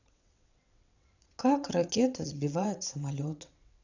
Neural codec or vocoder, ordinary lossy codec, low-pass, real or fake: vocoder, 22.05 kHz, 80 mel bands, WaveNeXt; none; 7.2 kHz; fake